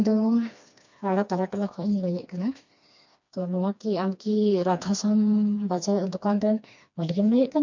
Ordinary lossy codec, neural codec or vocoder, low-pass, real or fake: MP3, 64 kbps; codec, 16 kHz, 2 kbps, FreqCodec, smaller model; 7.2 kHz; fake